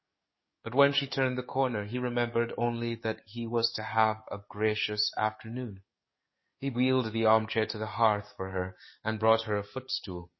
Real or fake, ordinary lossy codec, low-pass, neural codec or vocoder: fake; MP3, 24 kbps; 7.2 kHz; codec, 44.1 kHz, 7.8 kbps, DAC